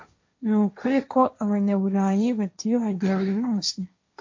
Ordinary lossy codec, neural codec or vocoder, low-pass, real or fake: MP3, 48 kbps; codec, 16 kHz, 1.1 kbps, Voila-Tokenizer; 7.2 kHz; fake